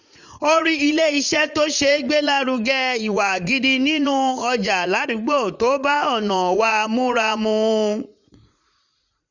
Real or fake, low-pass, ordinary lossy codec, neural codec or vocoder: fake; 7.2 kHz; none; vocoder, 44.1 kHz, 80 mel bands, Vocos